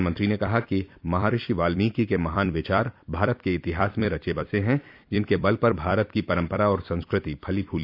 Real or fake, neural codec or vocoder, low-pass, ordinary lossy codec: fake; vocoder, 44.1 kHz, 128 mel bands every 256 samples, BigVGAN v2; 5.4 kHz; none